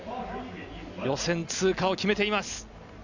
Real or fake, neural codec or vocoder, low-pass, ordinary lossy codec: real; none; 7.2 kHz; none